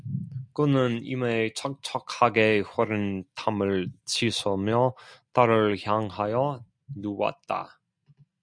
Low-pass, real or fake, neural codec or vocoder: 9.9 kHz; real; none